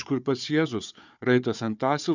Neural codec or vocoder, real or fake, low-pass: codec, 16 kHz, 4 kbps, FunCodec, trained on Chinese and English, 50 frames a second; fake; 7.2 kHz